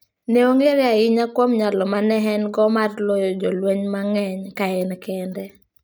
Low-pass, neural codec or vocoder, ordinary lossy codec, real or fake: none; none; none; real